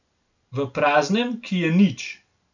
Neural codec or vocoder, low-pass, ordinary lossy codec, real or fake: none; 7.2 kHz; none; real